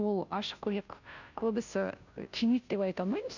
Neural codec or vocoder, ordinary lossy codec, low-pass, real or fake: codec, 16 kHz, 0.5 kbps, FunCodec, trained on Chinese and English, 25 frames a second; none; 7.2 kHz; fake